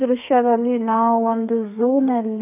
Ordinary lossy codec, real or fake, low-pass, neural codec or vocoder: none; fake; 3.6 kHz; codec, 32 kHz, 1.9 kbps, SNAC